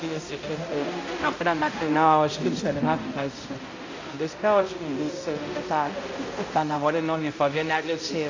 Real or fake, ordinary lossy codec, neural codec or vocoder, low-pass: fake; AAC, 32 kbps; codec, 16 kHz, 0.5 kbps, X-Codec, HuBERT features, trained on balanced general audio; 7.2 kHz